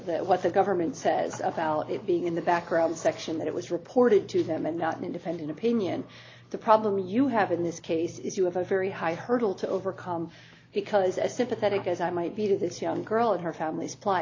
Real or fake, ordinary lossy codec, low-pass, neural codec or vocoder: real; AAC, 32 kbps; 7.2 kHz; none